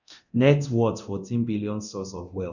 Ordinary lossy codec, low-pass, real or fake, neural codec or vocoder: none; 7.2 kHz; fake; codec, 24 kHz, 0.9 kbps, DualCodec